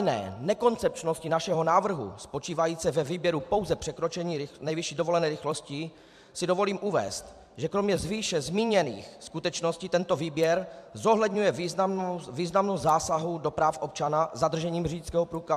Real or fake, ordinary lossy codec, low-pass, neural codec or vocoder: fake; MP3, 96 kbps; 14.4 kHz; vocoder, 44.1 kHz, 128 mel bands every 256 samples, BigVGAN v2